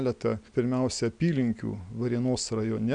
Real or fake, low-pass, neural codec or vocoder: real; 9.9 kHz; none